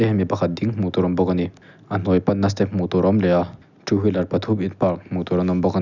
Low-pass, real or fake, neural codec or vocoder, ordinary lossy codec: 7.2 kHz; real; none; none